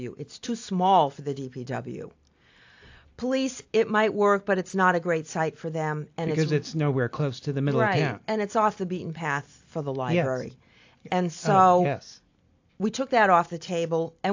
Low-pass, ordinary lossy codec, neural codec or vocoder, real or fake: 7.2 kHz; AAC, 48 kbps; none; real